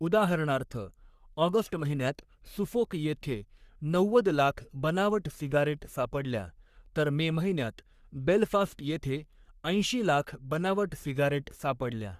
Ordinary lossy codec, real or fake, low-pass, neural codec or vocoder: Opus, 64 kbps; fake; 14.4 kHz; codec, 44.1 kHz, 3.4 kbps, Pupu-Codec